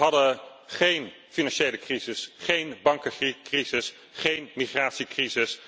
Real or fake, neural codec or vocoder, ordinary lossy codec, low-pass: real; none; none; none